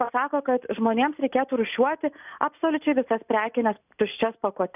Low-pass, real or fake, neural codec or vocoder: 3.6 kHz; real; none